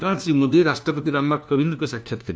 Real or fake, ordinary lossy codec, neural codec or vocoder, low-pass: fake; none; codec, 16 kHz, 1 kbps, FunCodec, trained on LibriTTS, 50 frames a second; none